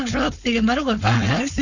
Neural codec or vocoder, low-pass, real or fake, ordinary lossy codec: codec, 16 kHz, 4.8 kbps, FACodec; 7.2 kHz; fake; none